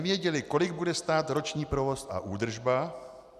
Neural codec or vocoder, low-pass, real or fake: none; 14.4 kHz; real